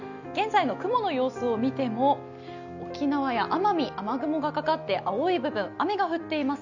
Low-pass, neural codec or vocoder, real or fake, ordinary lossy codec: 7.2 kHz; none; real; none